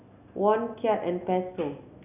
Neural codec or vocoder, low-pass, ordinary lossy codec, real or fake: none; 3.6 kHz; none; real